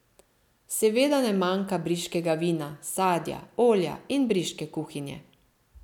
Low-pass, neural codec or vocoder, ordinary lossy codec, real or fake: 19.8 kHz; none; none; real